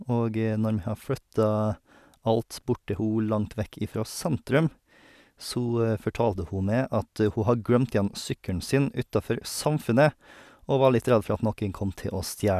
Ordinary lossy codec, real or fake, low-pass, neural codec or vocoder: none; real; 14.4 kHz; none